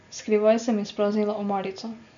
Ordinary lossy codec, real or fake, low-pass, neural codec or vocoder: none; real; 7.2 kHz; none